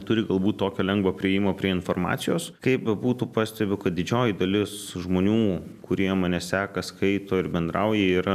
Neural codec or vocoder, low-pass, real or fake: none; 14.4 kHz; real